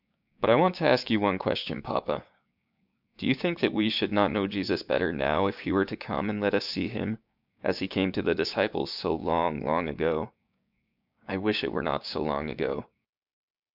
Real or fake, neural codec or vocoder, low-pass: fake; codec, 16 kHz, 6 kbps, DAC; 5.4 kHz